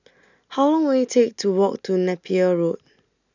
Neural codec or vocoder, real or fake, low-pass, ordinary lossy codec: none; real; 7.2 kHz; none